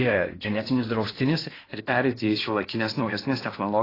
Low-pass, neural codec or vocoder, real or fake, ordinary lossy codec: 5.4 kHz; codec, 16 kHz in and 24 kHz out, 0.8 kbps, FocalCodec, streaming, 65536 codes; fake; AAC, 24 kbps